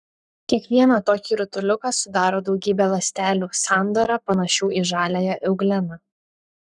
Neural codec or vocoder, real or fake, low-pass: codec, 44.1 kHz, 7.8 kbps, Pupu-Codec; fake; 10.8 kHz